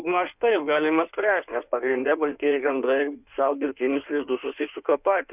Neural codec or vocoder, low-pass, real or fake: codec, 16 kHz in and 24 kHz out, 1.1 kbps, FireRedTTS-2 codec; 3.6 kHz; fake